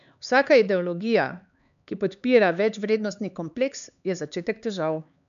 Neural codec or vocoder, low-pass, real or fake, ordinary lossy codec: codec, 16 kHz, 4 kbps, X-Codec, HuBERT features, trained on LibriSpeech; 7.2 kHz; fake; none